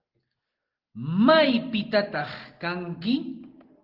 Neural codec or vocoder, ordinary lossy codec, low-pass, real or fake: none; Opus, 16 kbps; 5.4 kHz; real